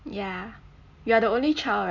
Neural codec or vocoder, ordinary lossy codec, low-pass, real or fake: none; none; 7.2 kHz; real